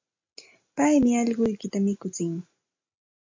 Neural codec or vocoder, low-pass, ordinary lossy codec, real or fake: none; 7.2 kHz; MP3, 64 kbps; real